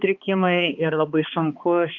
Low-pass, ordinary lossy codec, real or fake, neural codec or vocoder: 7.2 kHz; Opus, 24 kbps; fake; codec, 16 kHz, 2 kbps, X-Codec, HuBERT features, trained on balanced general audio